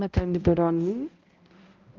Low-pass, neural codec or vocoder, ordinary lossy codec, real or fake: 7.2 kHz; codec, 16 kHz, 0.5 kbps, X-Codec, HuBERT features, trained on balanced general audio; Opus, 24 kbps; fake